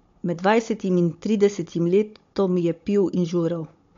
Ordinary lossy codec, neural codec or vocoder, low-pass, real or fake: MP3, 48 kbps; codec, 16 kHz, 16 kbps, FunCodec, trained on Chinese and English, 50 frames a second; 7.2 kHz; fake